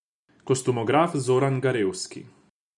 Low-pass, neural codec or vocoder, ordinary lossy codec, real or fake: 10.8 kHz; none; none; real